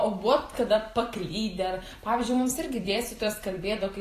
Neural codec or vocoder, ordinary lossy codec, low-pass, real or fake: vocoder, 44.1 kHz, 128 mel bands every 256 samples, BigVGAN v2; AAC, 48 kbps; 14.4 kHz; fake